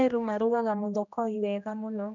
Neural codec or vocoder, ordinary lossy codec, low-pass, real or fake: codec, 16 kHz, 1 kbps, X-Codec, HuBERT features, trained on general audio; none; 7.2 kHz; fake